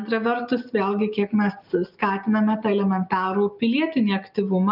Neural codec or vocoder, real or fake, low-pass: none; real; 5.4 kHz